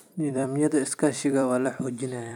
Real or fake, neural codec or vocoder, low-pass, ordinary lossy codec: fake; vocoder, 44.1 kHz, 128 mel bands every 256 samples, BigVGAN v2; 19.8 kHz; none